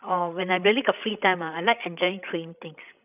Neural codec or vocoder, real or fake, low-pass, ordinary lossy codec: codec, 16 kHz, 16 kbps, FreqCodec, larger model; fake; 3.6 kHz; none